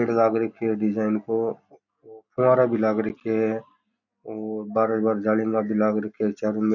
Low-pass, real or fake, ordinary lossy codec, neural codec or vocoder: 7.2 kHz; real; none; none